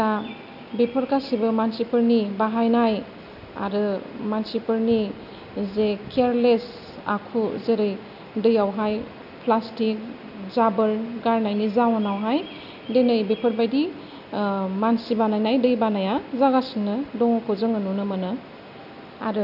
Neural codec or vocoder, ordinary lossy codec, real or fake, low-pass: none; none; real; 5.4 kHz